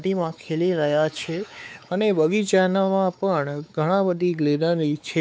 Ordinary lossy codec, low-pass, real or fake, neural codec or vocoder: none; none; fake; codec, 16 kHz, 4 kbps, X-Codec, WavLM features, trained on Multilingual LibriSpeech